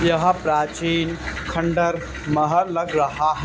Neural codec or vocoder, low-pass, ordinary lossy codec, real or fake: none; none; none; real